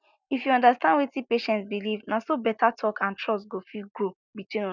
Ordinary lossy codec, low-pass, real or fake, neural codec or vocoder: none; 7.2 kHz; real; none